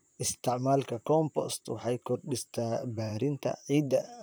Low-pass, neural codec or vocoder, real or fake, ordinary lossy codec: none; vocoder, 44.1 kHz, 128 mel bands, Pupu-Vocoder; fake; none